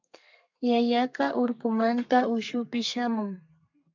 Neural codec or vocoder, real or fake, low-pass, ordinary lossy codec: codec, 32 kHz, 1.9 kbps, SNAC; fake; 7.2 kHz; MP3, 64 kbps